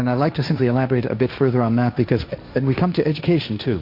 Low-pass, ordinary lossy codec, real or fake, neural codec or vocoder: 5.4 kHz; MP3, 48 kbps; fake; codec, 16 kHz, 1.1 kbps, Voila-Tokenizer